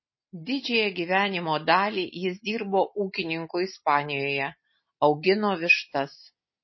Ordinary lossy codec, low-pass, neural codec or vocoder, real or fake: MP3, 24 kbps; 7.2 kHz; none; real